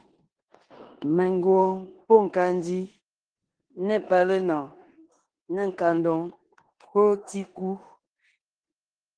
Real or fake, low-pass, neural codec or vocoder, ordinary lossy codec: fake; 9.9 kHz; codec, 16 kHz in and 24 kHz out, 0.9 kbps, LongCat-Audio-Codec, four codebook decoder; Opus, 16 kbps